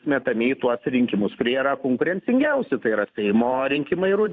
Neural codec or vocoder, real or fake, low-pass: vocoder, 44.1 kHz, 128 mel bands every 512 samples, BigVGAN v2; fake; 7.2 kHz